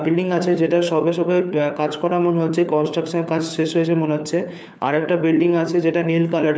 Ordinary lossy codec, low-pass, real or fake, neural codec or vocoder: none; none; fake; codec, 16 kHz, 4 kbps, FunCodec, trained on LibriTTS, 50 frames a second